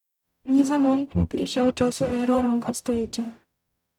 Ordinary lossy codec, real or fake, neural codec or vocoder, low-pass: none; fake; codec, 44.1 kHz, 0.9 kbps, DAC; 19.8 kHz